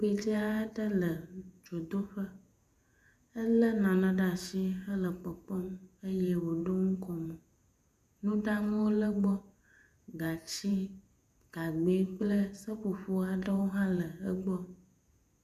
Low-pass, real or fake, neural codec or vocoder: 14.4 kHz; fake; vocoder, 48 kHz, 128 mel bands, Vocos